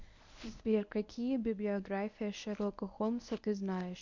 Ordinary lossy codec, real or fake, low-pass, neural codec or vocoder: MP3, 64 kbps; fake; 7.2 kHz; codec, 24 kHz, 0.9 kbps, WavTokenizer, medium speech release version 1